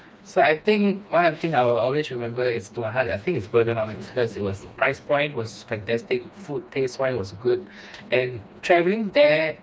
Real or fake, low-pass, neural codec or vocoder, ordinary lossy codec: fake; none; codec, 16 kHz, 2 kbps, FreqCodec, smaller model; none